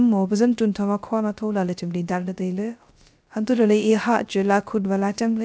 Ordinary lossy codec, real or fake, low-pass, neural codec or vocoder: none; fake; none; codec, 16 kHz, 0.3 kbps, FocalCodec